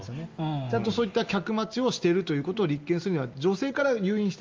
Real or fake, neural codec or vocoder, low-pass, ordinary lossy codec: real; none; 7.2 kHz; Opus, 32 kbps